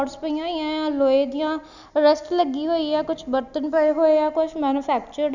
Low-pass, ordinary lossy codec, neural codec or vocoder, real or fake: 7.2 kHz; none; none; real